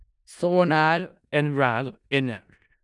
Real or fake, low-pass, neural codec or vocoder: fake; 10.8 kHz; codec, 16 kHz in and 24 kHz out, 0.4 kbps, LongCat-Audio-Codec, four codebook decoder